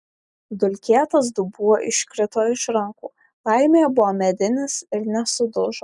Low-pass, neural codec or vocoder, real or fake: 10.8 kHz; none; real